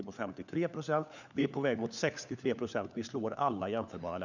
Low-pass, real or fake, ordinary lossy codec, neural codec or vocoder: 7.2 kHz; fake; none; codec, 16 kHz, 4 kbps, FunCodec, trained on LibriTTS, 50 frames a second